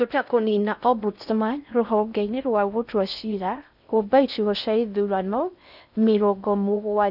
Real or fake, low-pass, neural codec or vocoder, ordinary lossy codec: fake; 5.4 kHz; codec, 16 kHz in and 24 kHz out, 0.6 kbps, FocalCodec, streaming, 4096 codes; none